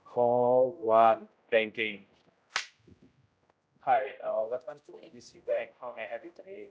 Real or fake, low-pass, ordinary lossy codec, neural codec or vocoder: fake; none; none; codec, 16 kHz, 0.5 kbps, X-Codec, HuBERT features, trained on general audio